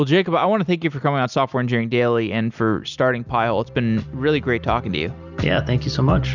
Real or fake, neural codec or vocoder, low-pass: real; none; 7.2 kHz